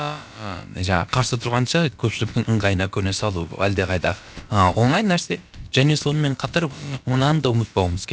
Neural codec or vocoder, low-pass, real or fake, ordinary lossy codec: codec, 16 kHz, about 1 kbps, DyCAST, with the encoder's durations; none; fake; none